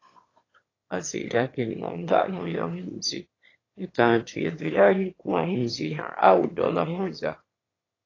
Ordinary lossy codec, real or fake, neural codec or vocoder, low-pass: AAC, 32 kbps; fake; autoencoder, 22.05 kHz, a latent of 192 numbers a frame, VITS, trained on one speaker; 7.2 kHz